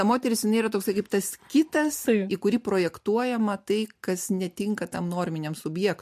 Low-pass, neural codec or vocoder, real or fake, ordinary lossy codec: 14.4 kHz; none; real; MP3, 64 kbps